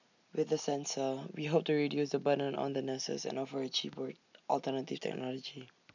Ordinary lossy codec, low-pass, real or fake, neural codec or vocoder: none; 7.2 kHz; real; none